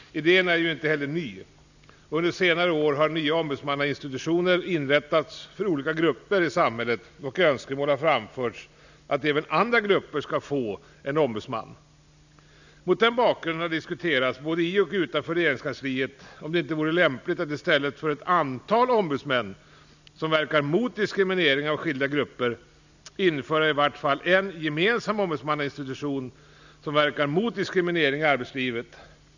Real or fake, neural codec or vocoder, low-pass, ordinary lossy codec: real; none; 7.2 kHz; none